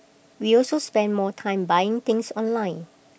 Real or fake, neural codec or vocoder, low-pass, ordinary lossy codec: real; none; none; none